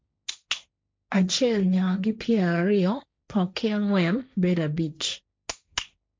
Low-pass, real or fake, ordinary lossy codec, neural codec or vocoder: none; fake; none; codec, 16 kHz, 1.1 kbps, Voila-Tokenizer